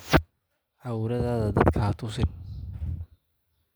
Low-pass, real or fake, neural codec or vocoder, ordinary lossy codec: none; real; none; none